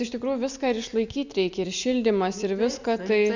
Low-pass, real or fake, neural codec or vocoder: 7.2 kHz; real; none